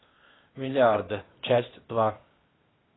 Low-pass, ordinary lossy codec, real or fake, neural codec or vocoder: 7.2 kHz; AAC, 16 kbps; fake; codec, 16 kHz, 0.8 kbps, ZipCodec